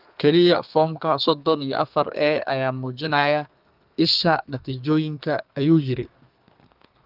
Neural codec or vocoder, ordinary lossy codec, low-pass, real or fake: codec, 32 kHz, 1.9 kbps, SNAC; Opus, 32 kbps; 5.4 kHz; fake